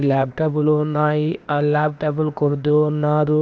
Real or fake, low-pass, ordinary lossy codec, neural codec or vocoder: fake; none; none; codec, 16 kHz, 0.8 kbps, ZipCodec